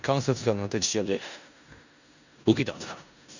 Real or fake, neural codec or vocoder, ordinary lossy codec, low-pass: fake; codec, 16 kHz in and 24 kHz out, 0.4 kbps, LongCat-Audio-Codec, four codebook decoder; none; 7.2 kHz